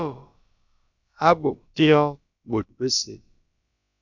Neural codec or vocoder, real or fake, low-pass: codec, 16 kHz, about 1 kbps, DyCAST, with the encoder's durations; fake; 7.2 kHz